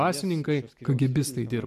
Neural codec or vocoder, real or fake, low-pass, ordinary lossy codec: none; real; 14.4 kHz; AAC, 96 kbps